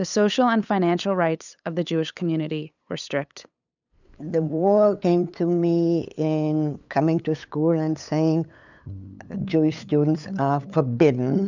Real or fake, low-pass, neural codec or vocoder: fake; 7.2 kHz; codec, 16 kHz, 8 kbps, FunCodec, trained on LibriTTS, 25 frames a second